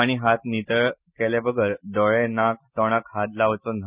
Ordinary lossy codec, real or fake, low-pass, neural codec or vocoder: Opus, 64 kbps; real; 3.6 kHz; none